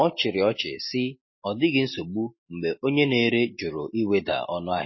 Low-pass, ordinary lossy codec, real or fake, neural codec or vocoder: 7.2 kHz; MP3, 24 kbps; real; none